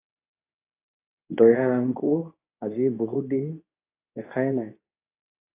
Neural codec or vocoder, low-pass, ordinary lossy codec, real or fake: codec, 24 kHz, 0.9 kbps, WavTokenizer, medium speech release version 2; 3.6 kHz; AAC, 24 kbps; fake